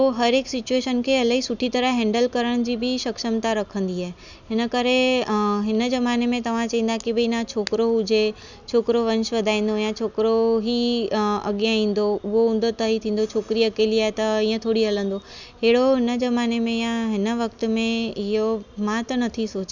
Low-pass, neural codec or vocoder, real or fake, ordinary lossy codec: 7.2 kHz; none; real; none